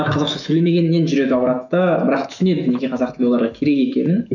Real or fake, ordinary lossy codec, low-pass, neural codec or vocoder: fake; none; 7.2 kHz; autoencoder, 48 kHz, 128 numbers a frame, DAC-VAE, trained on Japanese speech